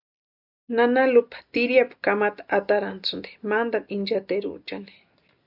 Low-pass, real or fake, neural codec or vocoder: 5.4 kHz; real; none